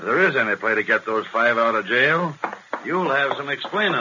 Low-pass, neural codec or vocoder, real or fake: 7.2 kHz; none; real